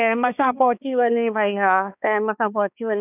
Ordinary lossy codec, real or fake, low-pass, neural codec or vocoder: none; fake; 3.6 kHz; codec, 16 kHz, 2 kbps, X-Codec, HuBERT features, trained on balanced general audio